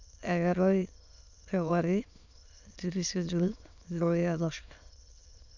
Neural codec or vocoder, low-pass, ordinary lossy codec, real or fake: autoencoder, 22.05 kHz, a latent of 192 numbers a frame, VITS, trained on many speakers; 7.2 kHz; none; fake